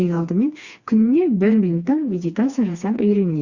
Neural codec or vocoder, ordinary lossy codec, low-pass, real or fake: codec, 16 kHz, 2 kbps, FreqCodec, smaller model; Opus, 64 kbps; 7.2 kHz; fake